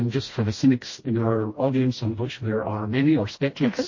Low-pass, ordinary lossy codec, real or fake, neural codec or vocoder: 7.2 kHz; MP3, 32 kbps; fake; codec, 16 kHz, 1 kbps, FreqCodec, smaller model